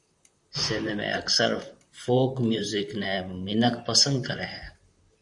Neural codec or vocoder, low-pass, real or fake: vocoder, 44.1 kHz, 128 mel bands, Pupu-Vocoder; 10.8 kHz; fake